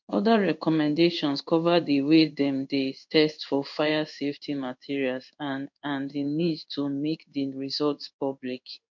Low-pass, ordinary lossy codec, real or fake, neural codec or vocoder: 7.2 kHz; MP3, 48 kbps; fake; codec, 16 kHz in and 24 kHz out, 1 kbps, XY-Tokenizer